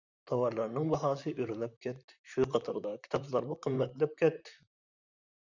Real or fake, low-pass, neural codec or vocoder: fake; 7.2 kHz; vocoder, 44.1 kHz, 128 mel bands, Pupu-Vocoder